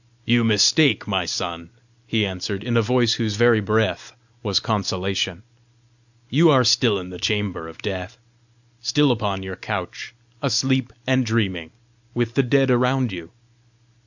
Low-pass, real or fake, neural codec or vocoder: 7.2 kHz; real; none